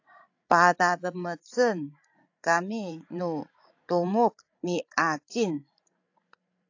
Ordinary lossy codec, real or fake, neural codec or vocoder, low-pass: AAC, 48 kbps; fake; codec, 16 kHz, 16 kbps, FreqCodec, larger model; 7.2 kHz